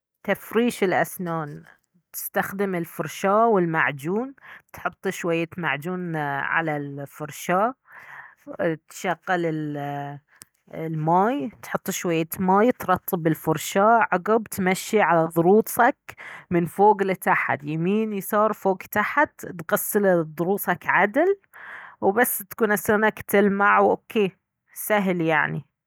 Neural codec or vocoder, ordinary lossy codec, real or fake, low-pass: none; none; real; none